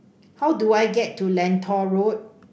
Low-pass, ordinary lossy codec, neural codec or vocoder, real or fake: none; none; none; real